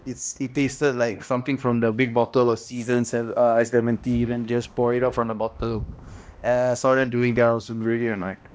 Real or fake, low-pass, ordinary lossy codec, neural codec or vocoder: fake; none; none; codec, 16 kHz, 1 kbps, X-Codec, HuBERT features, trained on balanced general audio